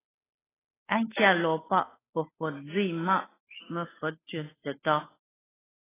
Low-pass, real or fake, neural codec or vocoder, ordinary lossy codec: 3.6 kHz; fake; codec, 16 kHz, 8 kbps, FunCodec, trained on Chinese and English, 25 frames a second; AAC, 16 kbps